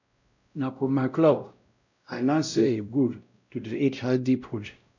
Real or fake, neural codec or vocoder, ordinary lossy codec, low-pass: fake; codec, 16 kHz, 0.5 kbps, X-Codec, WavLM features, trained on Multilingual LibriSpeech; none; 7.2 kHz